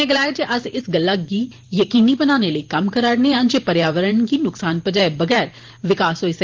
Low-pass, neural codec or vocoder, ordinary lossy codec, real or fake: 7.2 kHz; vocoder, 44.1 kHz, 128 mel bands every 512 samples, BigVGAN v2; Opus, 16 kbps; fake